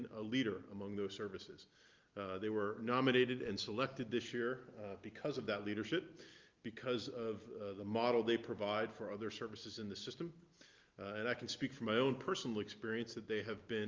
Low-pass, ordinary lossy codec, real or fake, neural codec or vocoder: 7.2 kHz; Opus, 32 kbps; real; none